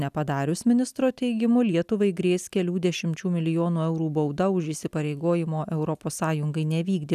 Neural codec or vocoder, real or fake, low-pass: none; real; 14.4 kHz